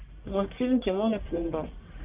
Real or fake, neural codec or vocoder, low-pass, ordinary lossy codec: fake; codec, 44.1 kHz, 1.7 kbps, Pupu-Codec; 3.6 kHz; Opus, 24 kbps